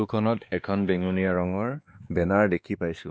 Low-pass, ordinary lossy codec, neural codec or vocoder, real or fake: none; none; codec, 16 kHz, 2 kbps, X-Codec, WavLM features, trained on Multilingual LibriSpeech; fake